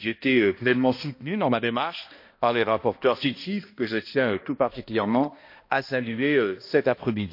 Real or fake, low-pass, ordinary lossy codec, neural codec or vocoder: fake; 5.4 kHz; MP3, 32 kbps; codec, 16 kHz, 1 kbps, X-Codec, HuBERT features, trained on balanced general audio